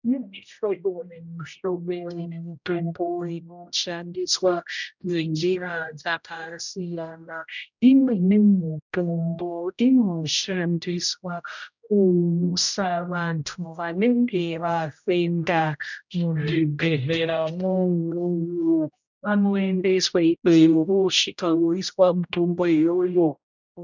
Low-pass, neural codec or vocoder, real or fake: 7.2 kHz; codec, 16 kHz, 0.5 kbps, X-Codec, HuBERT features, trained on general audio; fake